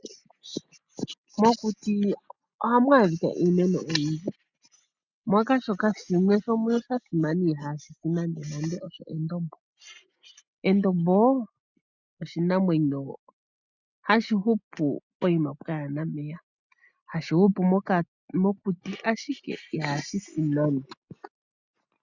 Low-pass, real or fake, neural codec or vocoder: 7.2 kHz; real; none